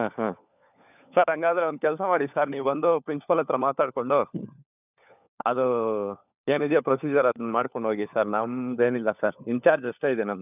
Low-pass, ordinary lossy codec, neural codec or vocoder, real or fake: 3.6 kHz; none; codec, 16 kHz, 4 kbps, FunCodec, trained on LibriTTS, 50 frames a second; fake